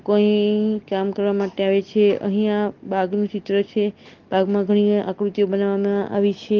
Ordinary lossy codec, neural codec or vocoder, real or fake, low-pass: Opus, 16 kbps; none; real; 7.2 kHz